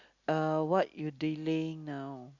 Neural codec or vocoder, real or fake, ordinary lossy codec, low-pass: none; real; Opus, 64 kbps; 7.2 kHz